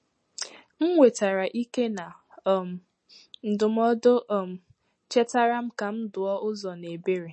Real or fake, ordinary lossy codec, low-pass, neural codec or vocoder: real; MP3, 32 kbps; 9.9 kHz; none